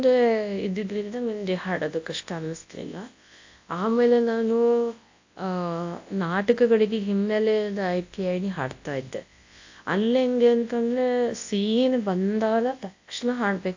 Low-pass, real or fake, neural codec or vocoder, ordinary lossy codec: 7.2 kHz; fake; codec, 24 kHz, 0.9 kbps, WavTokenizer, large speech release; AAC, 48 kbps